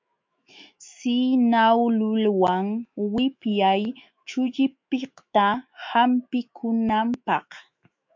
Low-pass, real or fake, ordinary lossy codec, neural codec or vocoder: 7.2 kHz; fake; MP3, 48 kbps; autoencoder, 48 kHz, 128 numbers a frame, DAC-VAE, trained on Japanese speech